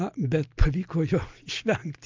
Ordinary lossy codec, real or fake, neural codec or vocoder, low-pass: Opus, 24 kbps; real; none; 7.2 kHz